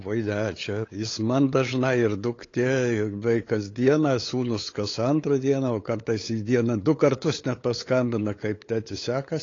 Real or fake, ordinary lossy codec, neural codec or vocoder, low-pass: fake; AAC, 32 kbps; codec, 16 kHz, 8 kbps, FunCodec, trained on LibriTTS, 25 frames a second; 7.2 kHz